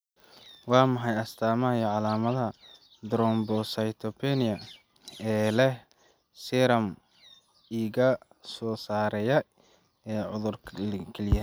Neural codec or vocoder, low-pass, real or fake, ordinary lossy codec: none; none; real; none